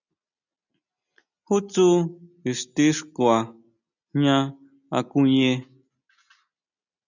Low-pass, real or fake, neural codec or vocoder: 7.2 kHz; real; none